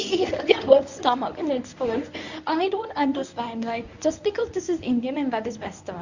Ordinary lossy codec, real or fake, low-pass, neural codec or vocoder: none; fake; 7.2 kHz; codec, 24 kHz, 0.9 kbps, WavTokenizer, medium speech release version 1